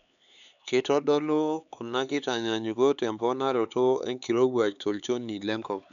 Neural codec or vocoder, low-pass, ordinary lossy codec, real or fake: codec, 16 kHz, 4 kbps, X-Codec, HuBERT features, trained on LibriSpeech; 7.2 kHz; none; fake